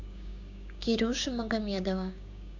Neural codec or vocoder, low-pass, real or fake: codec, 16 kHz, 6 kbps, DAC; 7.2 kHz; fake